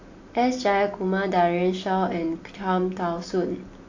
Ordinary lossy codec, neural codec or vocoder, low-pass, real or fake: AAC, 48 kbps; none; 7.2 kHz; real